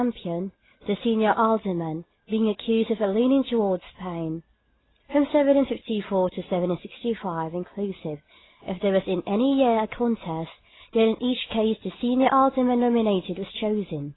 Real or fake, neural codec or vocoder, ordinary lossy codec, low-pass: real; none; AAC, 16 kbps; 7.2 kHz